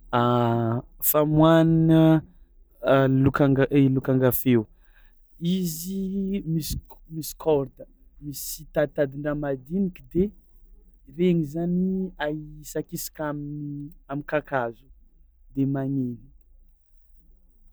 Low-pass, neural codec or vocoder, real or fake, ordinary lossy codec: none; none; real; none